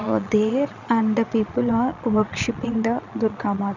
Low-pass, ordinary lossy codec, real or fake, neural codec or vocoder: 7.2 kHz; none; fake; vocoder, 22.05 kHz, 80 mel bands, Vocos